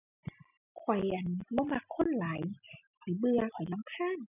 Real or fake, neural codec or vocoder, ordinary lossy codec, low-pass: real; none; none; 3.6 kHz